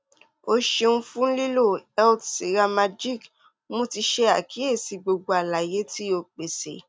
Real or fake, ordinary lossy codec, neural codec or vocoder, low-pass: real; none; none; none